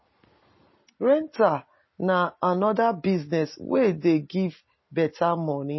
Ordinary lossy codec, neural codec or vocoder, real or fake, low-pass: MP3, 24 kbps; none; real; 7.2 kHz